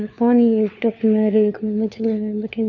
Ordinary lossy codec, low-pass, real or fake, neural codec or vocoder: none; 7.2 kHz; fake; codec, 16 kHz, 4 kbps, FunCodec, trained on LibriTTS, 50 frames a second